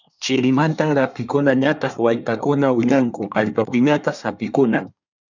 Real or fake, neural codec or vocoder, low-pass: fake; codec, 24 kHz, 1 kbps, SNAC; 7.2 kHz